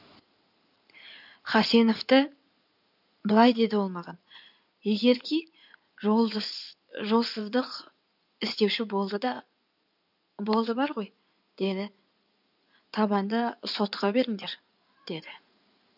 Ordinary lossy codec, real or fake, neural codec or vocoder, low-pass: none; fake; codec, 16 kHz in and 24 kHz out, 2.2 kbps, FireRedTTS-2 codec; 5.4 kHz